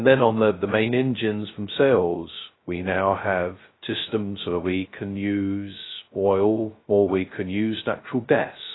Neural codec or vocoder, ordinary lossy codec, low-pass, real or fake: codec, 16 kHz, 0.2 kbps, FocalCodec; AAC, 16 kbps; 7.2 kHz; fake